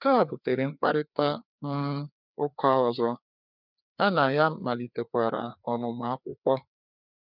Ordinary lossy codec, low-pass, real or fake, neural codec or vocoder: none; 5.4 kHz; fake; codec, 16 kHz, 2 kbps, FreqCodec, larger model